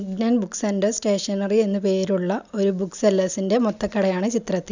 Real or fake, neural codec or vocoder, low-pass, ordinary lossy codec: real; none; 7.2 kHz; none